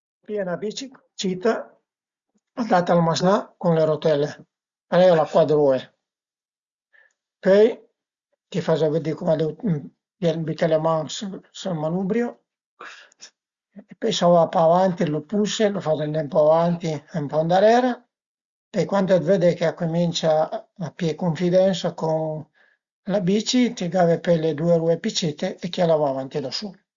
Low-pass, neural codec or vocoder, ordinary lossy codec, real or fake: 7.2 kHz; none; Opus, 64 kbps; real